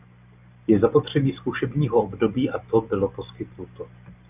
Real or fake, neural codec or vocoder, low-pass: real; none; 3.6 kHz